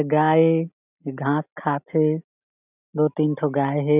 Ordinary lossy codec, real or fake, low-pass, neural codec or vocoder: none; real; 3.6 kHz; none